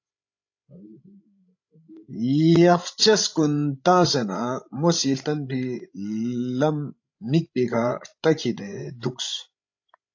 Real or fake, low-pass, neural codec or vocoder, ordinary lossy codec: fake; 7.2 kHz; codec, 16 kHz, 16 kbps, FreqCodec, larger model; AAC, 48 kbps